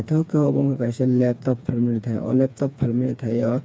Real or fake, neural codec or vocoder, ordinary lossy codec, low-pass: fake; codec, 16 kHz, 4 kbps, FreqCodec, smaller model; none; none